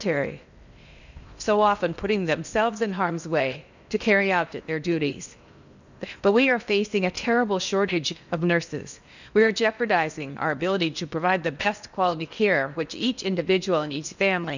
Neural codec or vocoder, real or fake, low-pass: codec, 16 kHz in and 24 kHz out, 0.8 kbps, FocalCodec, streaming, 65536 codes; fake; 7.2 kHz